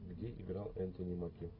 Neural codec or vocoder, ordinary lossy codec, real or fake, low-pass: none; AAC, 24 kbps; real; 5.4 kHz